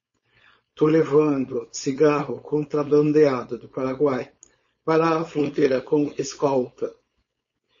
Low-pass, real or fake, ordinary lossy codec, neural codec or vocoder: 7.2 kHz; fake; MP3, 32 kbps; codec, 16 kHz, 4.8 kbps, FACodec